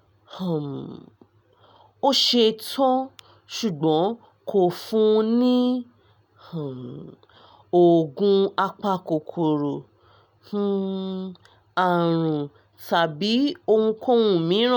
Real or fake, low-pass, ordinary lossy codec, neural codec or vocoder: real; none; none; none